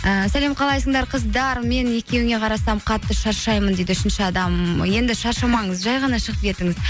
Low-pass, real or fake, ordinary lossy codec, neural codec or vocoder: none; real; none; none